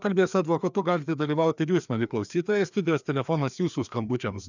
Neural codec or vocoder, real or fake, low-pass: codec, 16 kHz, 2 kbps, FreqCodec, larger model; fake; 7.2 kHz